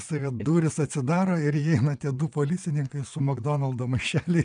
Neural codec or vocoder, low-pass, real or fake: vocoder, 22.05 kHz, 80 mel bands, WaveNeXt; 9.9 kHz; fake